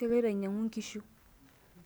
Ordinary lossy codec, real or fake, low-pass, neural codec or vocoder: none; real; none; none